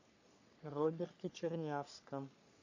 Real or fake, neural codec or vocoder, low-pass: fake; codec, 44.1 kHz, 3.4 kbps, Pupu-Codec; 7.2 kHz